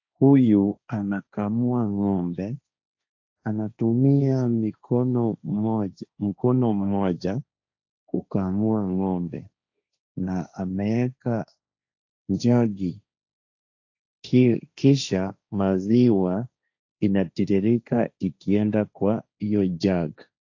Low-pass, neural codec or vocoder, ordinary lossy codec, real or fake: 7.2 kHz; codec, 16 kHz, 1.1 kbps, Voila-Tokenizer; AAC, 48 kbps; fake